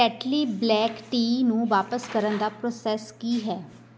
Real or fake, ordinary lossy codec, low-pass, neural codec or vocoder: real; none; none; none